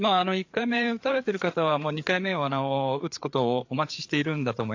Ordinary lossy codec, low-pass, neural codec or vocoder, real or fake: AAC, 48 kbps; 7.2 kHz; codec, 16 kHz, 4 kbps, FreqCodec, larger model; fake